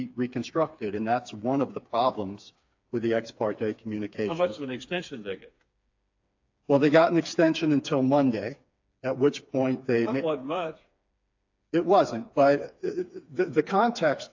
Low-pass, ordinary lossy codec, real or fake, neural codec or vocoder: 7.2 kHz; AAC, 48 kbps; fake; codec, 16 kHz, 4 kbps, FreqCodec, smaller model